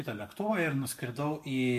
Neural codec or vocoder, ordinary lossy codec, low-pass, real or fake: none; MP3, 64 kbps; 14.4 kHz; real